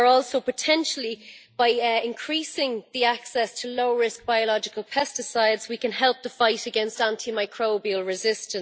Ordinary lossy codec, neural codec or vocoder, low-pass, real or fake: none; none; none; real